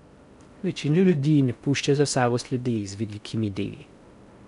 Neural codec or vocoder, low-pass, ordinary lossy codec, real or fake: codec, 16 kHz in and 24 kHz out, 0.6 kbps, FocalCodec, streaming, 4096 codes; 10.8 kHz; none; fake